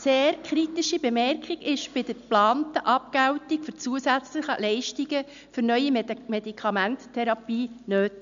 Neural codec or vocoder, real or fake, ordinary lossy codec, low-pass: none; real; AAC, 96 kbps; 7.2 kHz